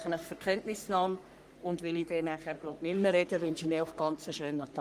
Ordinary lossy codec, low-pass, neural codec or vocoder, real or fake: Opus, 32 kbps; 14.4 kHz; codec, 44.1 kHz, 3.4 kbps, Pupu-Codec; fake